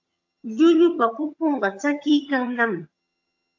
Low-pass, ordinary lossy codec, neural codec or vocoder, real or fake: 7.2 kHz; AAC, 48 kbps; vocoder, 22.05 kHz, 80 mel bands, HiFi-GAN; fake